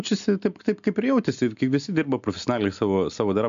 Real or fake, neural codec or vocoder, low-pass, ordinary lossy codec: real; none; 7.2 kHz; MP3, 64 kbps